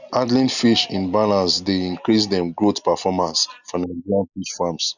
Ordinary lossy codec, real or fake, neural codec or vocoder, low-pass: none; real; none; 7.2 kHz